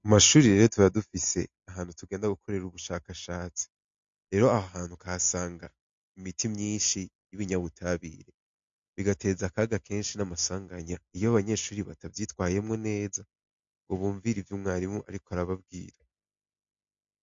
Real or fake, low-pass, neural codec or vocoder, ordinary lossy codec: real; 7.2 kHz; none; MP3, 48 kbps